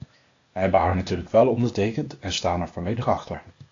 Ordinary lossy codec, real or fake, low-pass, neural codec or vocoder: AAC, 48 kbps; fake; 7.2 kHz; codec, 16 kHz, 0.8 kbps, ZipCodec